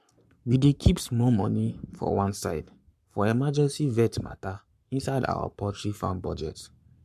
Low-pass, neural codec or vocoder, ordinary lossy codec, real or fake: 14.4 kHz; codec, 44.1 kHz, 7.8 kbps, Pupu-Codec; MP3, 96 kbps; fake